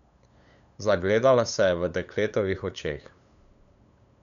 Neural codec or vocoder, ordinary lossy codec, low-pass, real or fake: codec, 16 kHz, 8 kbps, FunCodec, trained on LibriTTS, 25 frames a second; none; 7.2 kHz; fake